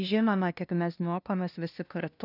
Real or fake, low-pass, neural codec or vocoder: fake; 5.4 kHz; codec, 16 kHz, 0.5 kbps, FunCodec, trained on LibriTTS, 25 frames a second